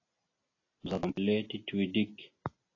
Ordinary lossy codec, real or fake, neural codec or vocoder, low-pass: AAC, 32 kbps; real; none; 7.2 kHz